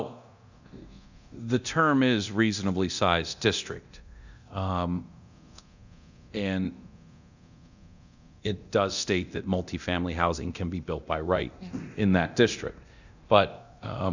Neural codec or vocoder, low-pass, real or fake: codec, 24 kHz, 0.9 kbps, DualCodec; 7.2 kHz; fake